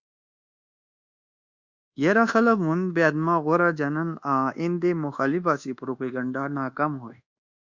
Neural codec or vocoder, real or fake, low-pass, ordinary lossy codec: codec, 24 kHz, 1.2 kbps, DualCodec; fake; 7.2 kHz; Opus, 64 kbps